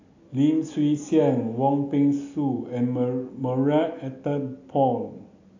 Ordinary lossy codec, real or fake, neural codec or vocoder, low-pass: AAC, 48 kbps; real; none; 7.2 kHz